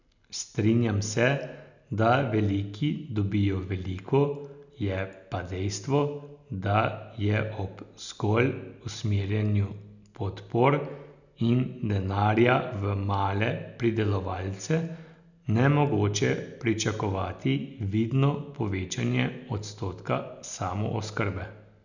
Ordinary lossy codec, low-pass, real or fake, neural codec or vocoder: none; 7.2 kHz; real; none